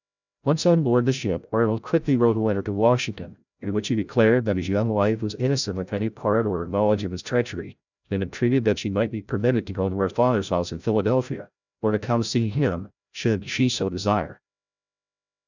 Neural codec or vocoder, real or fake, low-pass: codec, 16 kHz, 0.5 kbps, FreqCodec, larger model; fake; 7.2 kHz